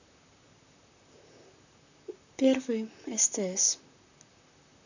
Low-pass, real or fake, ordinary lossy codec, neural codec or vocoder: 7.2 kHz; fake; none; vocoder, 44.1 kHz, 128 mel bands, Pupu-Vocoder